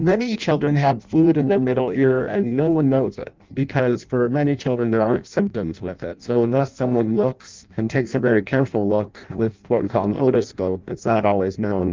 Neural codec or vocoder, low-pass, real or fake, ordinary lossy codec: codec, 16 kHz in and 24 kHz out, 0.6 kbps, FireRedTTS-2 codec; 7.2 kHz; fake; Opus, 32 kbps